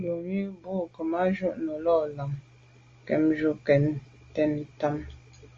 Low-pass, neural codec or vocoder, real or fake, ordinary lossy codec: 7.2 kHz; none; real; Opus, 32 kbps